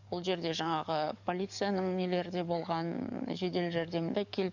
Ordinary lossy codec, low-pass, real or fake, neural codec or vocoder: none; 7.2 kHz; fake; codec, 44.1 kHz, 7.8 kbps, DAC